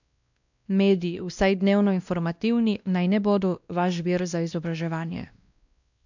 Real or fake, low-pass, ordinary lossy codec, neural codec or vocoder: fake; 7.2 kHz; none; codec, 16 kHz, 1 kbps, X-Codec, WavLM features, trained on Multilingual LibriSpeech